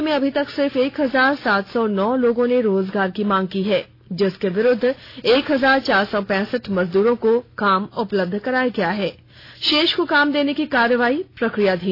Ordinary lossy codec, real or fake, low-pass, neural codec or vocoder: AAC, 32 kbps; real; 5.4 kHz; none